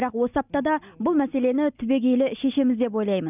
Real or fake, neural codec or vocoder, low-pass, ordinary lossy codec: real; none; 3.6 kHz; none